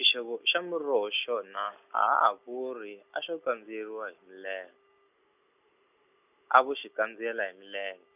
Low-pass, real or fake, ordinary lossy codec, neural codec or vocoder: 3.6 kHz; real; none; none